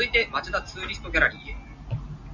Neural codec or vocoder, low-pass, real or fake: none; 7.2 kHz; real